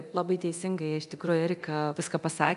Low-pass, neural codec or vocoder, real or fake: 10.8 kHz; codec, 24 kHz, 0.9 kbps, DualCodec; fake